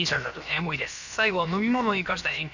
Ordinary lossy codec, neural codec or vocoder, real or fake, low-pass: none; codec, 16 kHz, about 1 kbps, DyCAST, with the encoder's durations; fake; 7.2 kHz